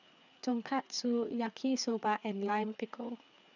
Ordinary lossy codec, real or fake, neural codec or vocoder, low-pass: none; fake; codec, 16 kHz, 4 kbps, FreqCodec, larger model; 7.2 kHz